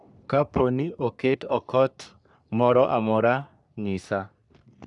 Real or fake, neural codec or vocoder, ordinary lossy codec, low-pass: fake; codec, 44.1 kHz, 3.4 kbps, Pupu-Codec; none; 10.8 kHz